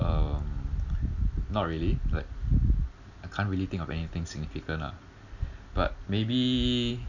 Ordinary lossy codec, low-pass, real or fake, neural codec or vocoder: none; 7.2 kHz; real; none